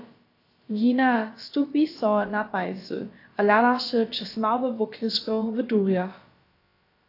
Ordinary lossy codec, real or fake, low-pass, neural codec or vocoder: AAC, 32 kbps; fake; 5.4 kHz; codec, 16 kHz, about 1 kbps, DyCAST, with the encoder's durations